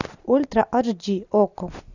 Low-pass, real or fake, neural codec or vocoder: 7.2 kHz; real; none